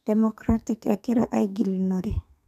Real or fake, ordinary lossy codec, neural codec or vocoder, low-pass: fake; none; codec, 32 kHz, 1.9 kbps, SNAC; 14.4 kHz